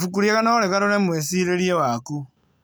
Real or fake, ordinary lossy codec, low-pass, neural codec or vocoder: real; none; none; none